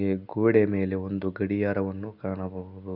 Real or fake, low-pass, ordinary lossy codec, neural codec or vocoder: real; 5.4 kHz; none; none